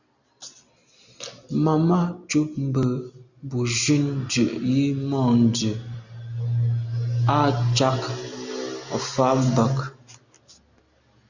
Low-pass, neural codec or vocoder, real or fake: 7.2 kHz; none; real